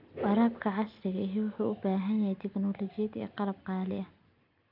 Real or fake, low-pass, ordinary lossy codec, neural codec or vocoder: real; 5.4 kHz; none; none